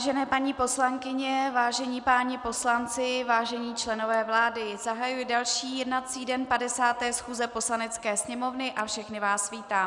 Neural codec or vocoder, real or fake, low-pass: none; real; 10.8 kHz